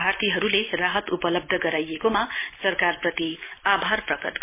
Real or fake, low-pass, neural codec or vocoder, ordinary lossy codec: real; 3.6 kHz; none; MP3, 24 kbps